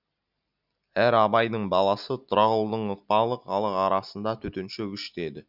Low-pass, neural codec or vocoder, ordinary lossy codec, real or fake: 5.4 kHz; none; none; real